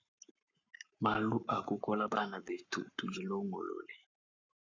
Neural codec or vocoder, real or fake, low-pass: codec, 44.1 kHz, 7.8 kbps, Pupu-Codec; fake; 7.2 kHz